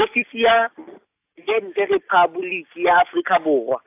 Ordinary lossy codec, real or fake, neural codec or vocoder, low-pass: AAC, 32 kbps; real; none; 3.6 kHz